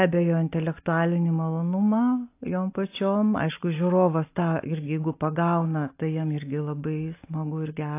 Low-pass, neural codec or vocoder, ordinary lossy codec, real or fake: 3.6 kHz; none; AAC, 24 kbps; real